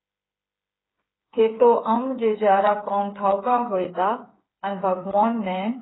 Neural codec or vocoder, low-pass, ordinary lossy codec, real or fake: codec, 16 kHz, 8 kbps, FreqCodec, smaller model; 7.2 kHz; AAC, 16 kbps; fake